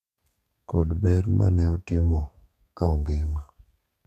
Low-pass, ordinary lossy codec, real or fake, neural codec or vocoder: 14.4 kHz; none; fake; codec, 32 kHz, 1.9 kbps, SNAC